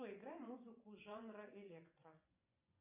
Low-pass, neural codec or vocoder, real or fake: 3.6 kHz; vocoder, 24 kHz, 100 mel bands, Vocos; fake